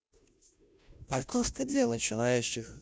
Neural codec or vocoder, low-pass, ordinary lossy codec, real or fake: codec, 16 kHz, 0.5 kbps, FunCodec, trained on Chinese and English, 25 frames a second; none; none; fake